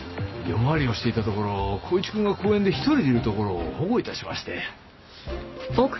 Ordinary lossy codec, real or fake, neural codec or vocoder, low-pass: MP3, 24 kbps; real; none; 7.2 kHz